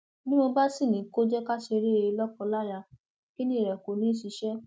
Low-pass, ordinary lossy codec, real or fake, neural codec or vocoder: none; none; real; none